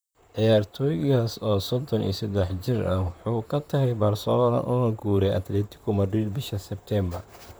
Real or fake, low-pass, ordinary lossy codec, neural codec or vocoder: fake; none; none; vocoder, 44.1 kHz, 128 mel bands, Pupu-Vocoder